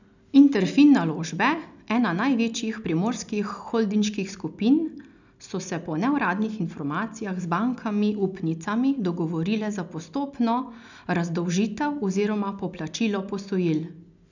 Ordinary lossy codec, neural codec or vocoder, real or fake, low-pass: none; none; real; 7.2 kHz